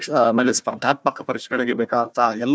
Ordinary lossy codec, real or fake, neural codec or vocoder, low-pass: none; fake; codec, 16 kHz, 1 kbps, FunCodec, trained on Chinese and English, 50 frames a second; none